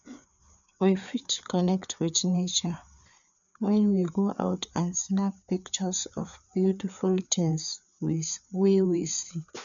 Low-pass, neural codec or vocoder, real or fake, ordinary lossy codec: 7.2 kHz; codec, 16 kHz, 4 kbps, FreqCodec, larger model; fake; none